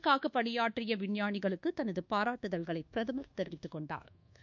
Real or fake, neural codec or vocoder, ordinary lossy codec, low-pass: fake; codec, 24 kHz, 1.2 kbps, DualCodec; none; 7.2 kHz